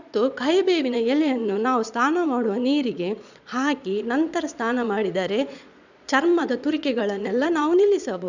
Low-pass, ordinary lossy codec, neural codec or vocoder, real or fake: 7.2 kHz; none; vocoder, 22.05 kHz, 80 mel bands, Vocos; fake